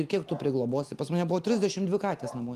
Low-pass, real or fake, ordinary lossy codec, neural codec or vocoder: 14.4 kHz; real; Opus, 32 kbps; none